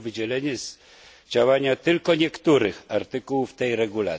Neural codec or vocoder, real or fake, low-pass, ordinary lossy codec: none; real; none; none